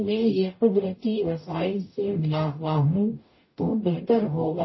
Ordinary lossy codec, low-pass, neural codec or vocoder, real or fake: MP3, 24 kbps; 7.2 kHz; codec, 44.1 kHz, 0.9 kbps, DAC; fake